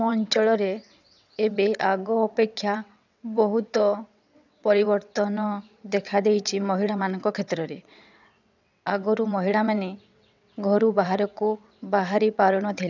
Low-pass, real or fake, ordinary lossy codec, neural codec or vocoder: 7.2 kHz; fake; none; vocoder, 22.05 kHz, 80 mel bands, WaveNeXt